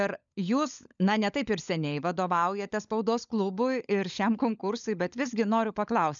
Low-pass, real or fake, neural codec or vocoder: 7.2 kHz; fake; codec, 16 kHz, 16 kbps, FunCodec, trained on LibriTTS, 50 frames a second